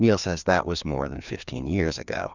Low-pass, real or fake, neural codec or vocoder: 7.2 kHz; fake; codec, 16 kHz, 2 kbps, FreqCodec, larger model